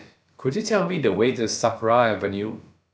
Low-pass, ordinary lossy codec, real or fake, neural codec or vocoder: none; none; fake; codec, 16 kHz, about 1 kbps, DyCAST, with the encoder's durations